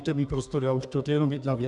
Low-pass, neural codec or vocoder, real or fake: 10.8 kHz; codec, 32 kHz, 1.9 kbps, SNAC; fake